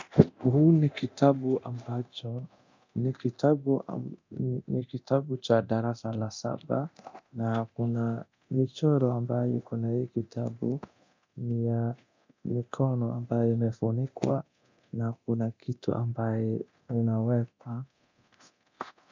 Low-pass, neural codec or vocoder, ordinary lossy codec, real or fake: 7.2 kHz; codec, 24 kHz, 0.9 kbps, DualCodec; MP3, 64 kbps; fake